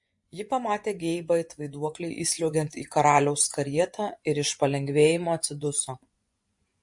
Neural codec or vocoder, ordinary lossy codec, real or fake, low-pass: vocoder, 24 kHz, 100 mel bands, Vocos; MP3, 48 kbps; fake; 10.8 kHz